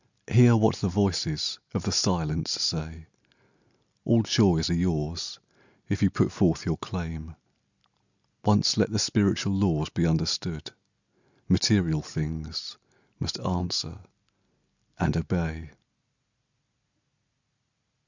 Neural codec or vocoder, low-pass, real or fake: none; 7.2 kHz; real